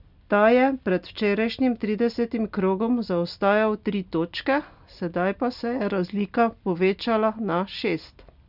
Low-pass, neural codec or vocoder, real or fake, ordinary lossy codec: 5.4 kHz; none; real; none